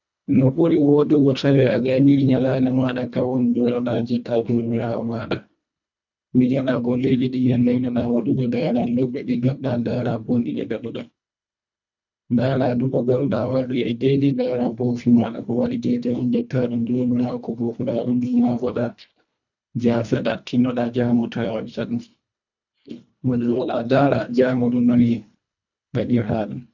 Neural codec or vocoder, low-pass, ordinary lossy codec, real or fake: codec, 24 kHz, 1.5 kbps, HILCodec; 7.2 kHz; none; fake